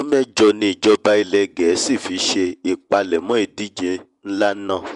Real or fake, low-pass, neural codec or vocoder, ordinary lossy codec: fake; 10.8 kHz; vocoder, 24 kHz, 100 mel bands, Vocos; none